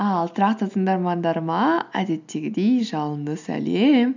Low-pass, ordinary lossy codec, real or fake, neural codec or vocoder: 7.2 kHz; none; real; none